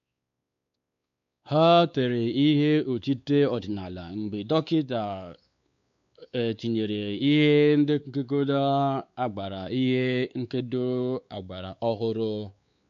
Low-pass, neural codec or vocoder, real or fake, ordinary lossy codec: 7.2 kHz; codec, 16 kHz, 4 kbps, X-Codec, WavLM features, trained on Multilingual LibriSpeech; fake; MP3, 64 kbps